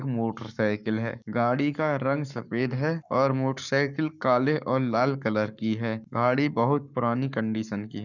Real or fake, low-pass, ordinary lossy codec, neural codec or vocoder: fake; 7.2 kHz; none; codec, 44.1 kHz, 7.8 kbps, Pupu-Codec